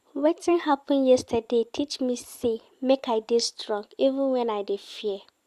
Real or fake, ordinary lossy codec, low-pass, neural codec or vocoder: fake; Opus, 64 kbps; 14.4 kHz; vocoder, 44.1 kHz, 128 mel bands every 256 samples, BigVGAN v2